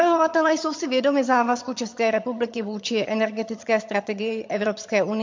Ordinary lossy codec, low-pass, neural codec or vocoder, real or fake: MP3, 48 kbps; 7.2 kHz; vocoder, 22.05 kHz, 80 mel bands, HiFi-GAN; fake